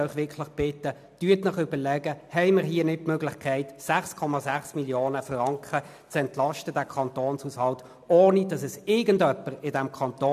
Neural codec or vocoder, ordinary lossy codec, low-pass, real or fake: none; AAC, 96 kbps; 14.4 kHz; real